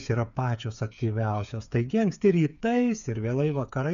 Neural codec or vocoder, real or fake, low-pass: codec, 16 kHz, 8 kbps, FreqCodec, smaller model; fake; 7.2 kHz